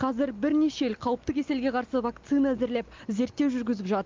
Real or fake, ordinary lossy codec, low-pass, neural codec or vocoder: real; Opus, 32 kbps; 7.2 kHz; none